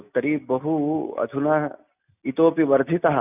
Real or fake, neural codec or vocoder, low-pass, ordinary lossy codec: real; none; 3.6 kHz; none